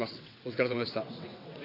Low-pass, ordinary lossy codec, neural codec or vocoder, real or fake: 5.4 kHz; AAC, 32 kbps; vocoder, 22.05 kHz, 80 mel bands, WaveNeXt; fake